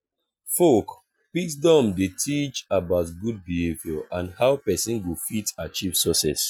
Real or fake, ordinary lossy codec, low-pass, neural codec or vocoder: real; none; none; none